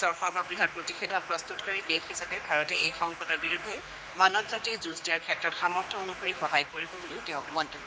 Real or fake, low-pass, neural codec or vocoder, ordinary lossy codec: fake; none; codec, 16 kHz, 2 kbps, X-Codec, HuBERT features, trained on general audio; none